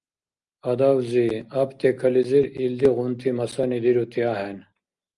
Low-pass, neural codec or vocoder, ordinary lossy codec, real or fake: 10.8 kHz; none; Opus, 32 kbps; real